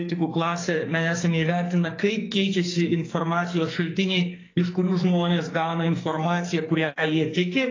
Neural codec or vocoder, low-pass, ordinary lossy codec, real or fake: codec, 44.1 kHz, 2.6 kbps, SNAC; 7.2 kHz; AAC, 32 kbps; fake